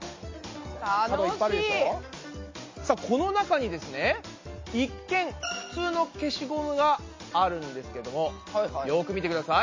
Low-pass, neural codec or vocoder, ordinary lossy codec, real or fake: 7.2 kHz; none; MP3, 32 kbps; real